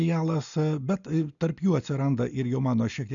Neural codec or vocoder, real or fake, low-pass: none; real; 7.2 kHz